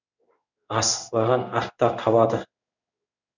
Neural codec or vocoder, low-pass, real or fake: codec, 16 kHz in and 24 kHz out, 1 kbps, XY-Tokenizer; 7.2 kHz; fake